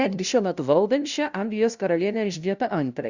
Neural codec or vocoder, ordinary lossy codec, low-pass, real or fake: codec, 16 kHz, 0.5 kbps, FunCodec, trained on LibriTTS, 25 frames a second; Opus, 64 kbps; 7.2 kHz; fake